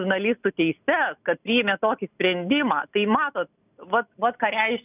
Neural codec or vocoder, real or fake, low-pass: none; real; 3.6 kHz